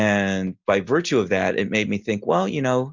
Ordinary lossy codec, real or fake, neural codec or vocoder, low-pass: Opus, 64 kbps; real; none; 7.2 kHz